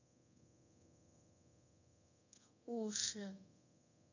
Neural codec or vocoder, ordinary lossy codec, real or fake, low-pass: codec, 24 kHz, 0.5 kbps, DualCodec; none; fake; 7.2 kHz